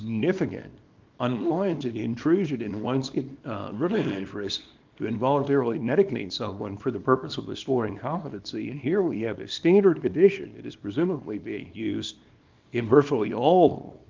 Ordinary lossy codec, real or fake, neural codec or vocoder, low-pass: Opus, 24 kbps; fake; codec, 24 kHz, 0.9 kbps, WavTokenizer, small release; 7.2 kHz